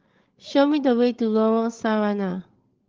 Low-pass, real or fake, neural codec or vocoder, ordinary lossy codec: 7.2 kHz; fake; codec, 16 kHz, 8 kbps, FreqCodec, larger model; Opus, 16 kbps